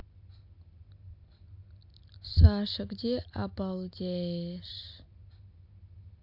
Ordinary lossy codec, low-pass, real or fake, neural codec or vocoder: AAC, 48 kbps; 5.4 kHz; real; none